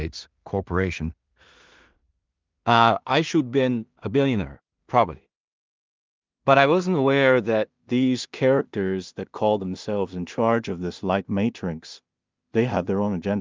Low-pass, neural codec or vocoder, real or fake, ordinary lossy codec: 7.2 kHz; codec, 16 kHz in and 24 kHz out, 0.4 kbps, LongCat-Audio-Codec, two codebook decoder; fake; Opus, 24 kbps